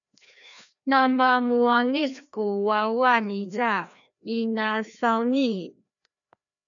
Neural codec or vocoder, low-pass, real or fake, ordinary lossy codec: codec, 16 kHz, 1 kbps, FreqCodec, larger model; 7.2 kHz; fake; MP3, 96 kbps